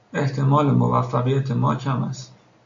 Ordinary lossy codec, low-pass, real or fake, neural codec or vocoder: MP3, 64 kbps; 7.2 kHz; real; none